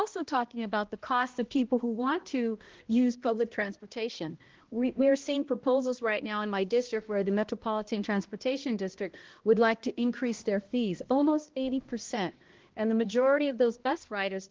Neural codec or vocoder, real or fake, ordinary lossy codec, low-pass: codec, 16 kHz, 1 kbps, X-Codec, HuBERT features, trained on balanced general audio; fake; Opus, 16 kbps; 7.2 kHz